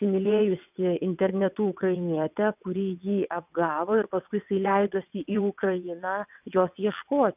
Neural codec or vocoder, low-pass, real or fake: vocoder, 22.05 kHz, 80 mel bands, WaveNeXt; 3.6 kHz; fake